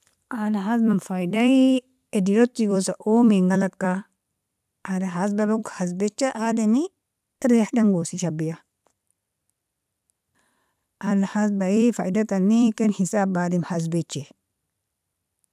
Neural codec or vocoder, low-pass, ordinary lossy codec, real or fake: vocoder, 44.1 kHz, 128 mel bands every 256 samples, BigVGAN v2; 14.4 kHz; none; fake